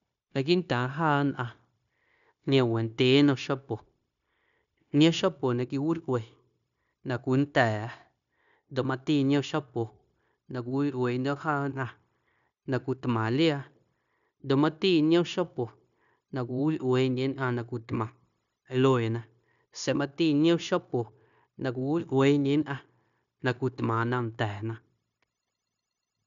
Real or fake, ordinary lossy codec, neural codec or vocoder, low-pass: fake; none; codec, 16 kHz, 0.9 kbps, LongCat-Audio-Codec; 7.2 kHz